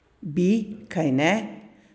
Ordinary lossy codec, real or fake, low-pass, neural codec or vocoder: none; real; none; none